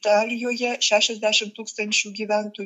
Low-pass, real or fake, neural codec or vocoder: 14.4 kHz; fake; autoencoder, 48 kHz, 128 numbers a frame, DAC-VAE, trained on Japanese speech